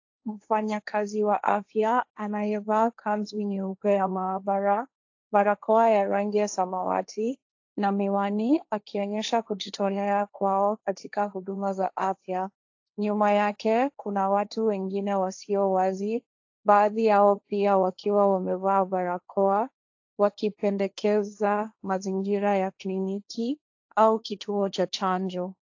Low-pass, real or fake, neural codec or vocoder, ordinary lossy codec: 7.2 kHz; fake; codec, 16 kHz, 1.1 kbps, Voila-Tokenizer; AAC, 48 kbps